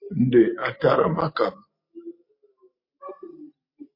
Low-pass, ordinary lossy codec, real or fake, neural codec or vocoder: 5.4 kHz; MP3, 32 kbps; real; none